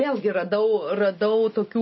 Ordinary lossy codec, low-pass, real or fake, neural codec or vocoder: MP3, 24 kbps; 7.2 kHz; real; none